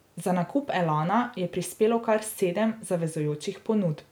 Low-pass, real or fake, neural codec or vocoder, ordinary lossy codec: none; real; none; none